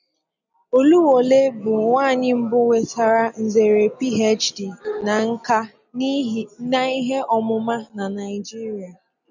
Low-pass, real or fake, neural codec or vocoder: 7.2 kHz; real; none